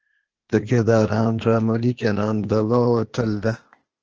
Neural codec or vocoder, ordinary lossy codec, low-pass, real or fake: codec, 16 kHz, 0.8 kbps, ZipCodec; Opus, 16 kbps; 7.2 kHz; fake